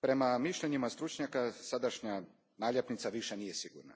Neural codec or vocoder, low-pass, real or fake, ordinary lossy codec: none; none; real; none